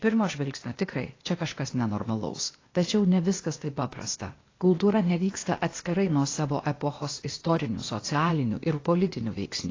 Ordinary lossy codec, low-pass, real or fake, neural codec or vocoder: AAC, 32 kbps; 7.2 kHz; fake; codec, 16 kHz, 0.8 kbps, ZipCodec